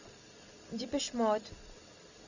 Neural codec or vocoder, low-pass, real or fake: none; 7.2 kHz; real